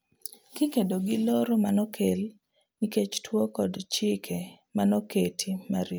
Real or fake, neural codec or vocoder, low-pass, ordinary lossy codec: real; none; none; none